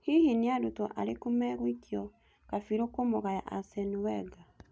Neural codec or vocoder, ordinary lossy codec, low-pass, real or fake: none; none; none; real